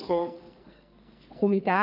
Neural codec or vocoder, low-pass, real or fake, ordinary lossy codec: codec, 44.1 kHz, 2.6 kbps, SNAC; 5.4 kHz; fake; none